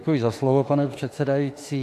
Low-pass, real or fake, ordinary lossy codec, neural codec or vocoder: 14.4 kHz; fake; AAC, 64 kbps; autoencoder, 48 kHz, 32 numbers a frame, DAC-VAE, trained on Japanese speech